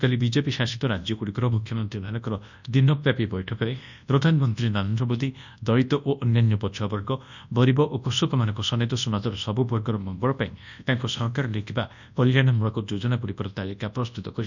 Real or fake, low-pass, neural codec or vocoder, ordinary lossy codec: fake; 7.2 kHz; codec, 24 kHz, 0.9 kbps, WavTokenizer, large speech release; none